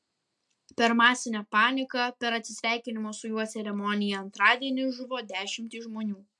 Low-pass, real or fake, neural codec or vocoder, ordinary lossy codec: 10.8 kHz; real; none; MP3, 64 kbps